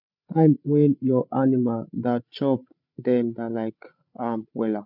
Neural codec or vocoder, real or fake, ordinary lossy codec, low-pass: codec, 16 kHz, 16 kbps, FreqCodec, larger model; fake; none; 5.4 kHz